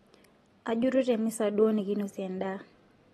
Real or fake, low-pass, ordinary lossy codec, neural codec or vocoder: real; 14.4 kHz; AAC, 32 kbps; none